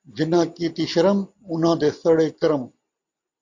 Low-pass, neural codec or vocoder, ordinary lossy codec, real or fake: 7.2 kHz; none; AAC, 48 kbps; real